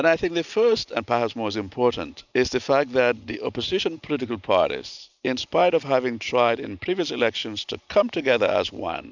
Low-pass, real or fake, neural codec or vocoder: 7.2 kHz; real; none